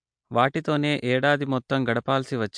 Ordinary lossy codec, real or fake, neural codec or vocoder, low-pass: MP3, 64 kbps; real; none; 9.9 kHz